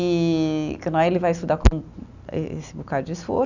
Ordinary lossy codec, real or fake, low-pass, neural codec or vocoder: none; real; 7.2 kHz; none